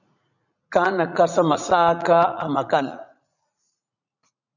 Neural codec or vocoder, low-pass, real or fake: vocoder, 44.1 kHz, 80 mel bands, Vocos; 7.2 kHz; fake